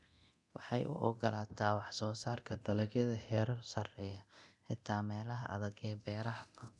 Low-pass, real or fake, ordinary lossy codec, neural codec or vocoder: 10.8 kHz; fake; none; codec, 24 kHz, 0.9 kbps, DualCodec